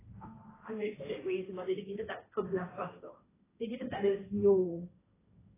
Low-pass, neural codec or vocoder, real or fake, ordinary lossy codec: 3.6 kHz; codec, 16 kHz, 1.1 kbps, Voila-Tokenizer; fake; AAC, 16 kbps